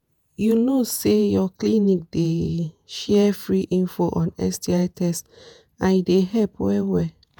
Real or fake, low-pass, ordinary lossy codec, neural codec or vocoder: fake; none; none; vocoder, 48 kHz, 128 mel bands, Vocos